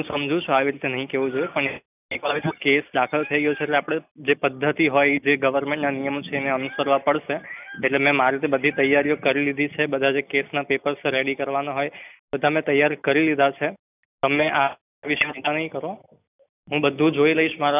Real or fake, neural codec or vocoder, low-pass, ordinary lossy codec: real; none; 3.6 kHz; none